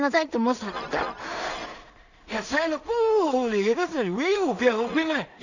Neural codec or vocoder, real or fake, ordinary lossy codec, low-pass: codec, 16 kHz in and 24 kHz out, 0.4 kbps, LongCat-Audio-Codec, two codebook decoder; fake; none; 7.2 kHz